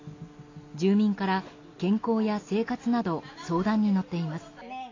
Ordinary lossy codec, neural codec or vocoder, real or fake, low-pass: AAC, 32 kbps; none; real; 7.2 kHz